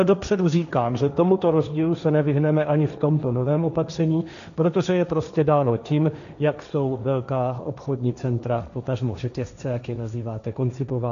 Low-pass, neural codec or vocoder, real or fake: 7.2 kHz; codec, 16 kHz, 1.1 kbps, Voila-Tokenizer; fake